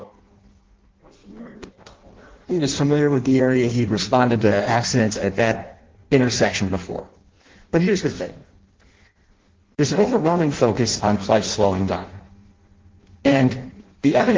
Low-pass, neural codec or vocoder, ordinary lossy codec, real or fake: 7.2 kHz; codec, 16 kHz in and 24 kHz out, 0.6 kbps, FireRedTTS-2 codec; Opus, 16 kbps; fake